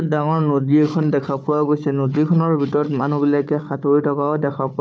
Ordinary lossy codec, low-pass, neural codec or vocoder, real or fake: none; none; codec, 16 kHz, 4 kbps, FunCodec, trained on Chinese and English, 50 frames a second; fake